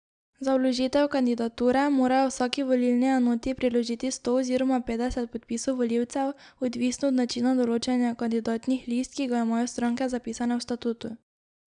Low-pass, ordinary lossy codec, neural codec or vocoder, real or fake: 9.9 kHz; none; none; real